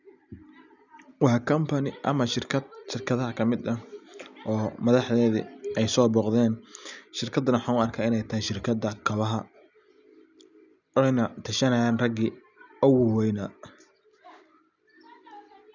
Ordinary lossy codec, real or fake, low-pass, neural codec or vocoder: none; real; 7.2 kHz; none